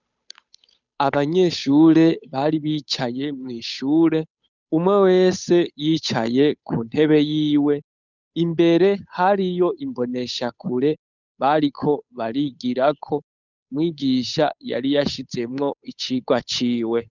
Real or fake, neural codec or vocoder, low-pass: fake; codec, 16 kHz, 8 kbps, FunCodec, trained on Chinese and English, 25 frames a second; 7.2 kHz